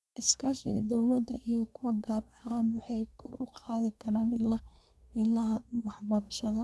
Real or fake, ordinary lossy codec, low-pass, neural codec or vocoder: fake; none; none; codec, 24 kHz, 1 kbps, SNAC